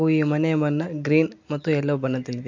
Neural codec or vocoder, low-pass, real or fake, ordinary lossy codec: none; 7.2 kHz; real; MP3, 48 kbps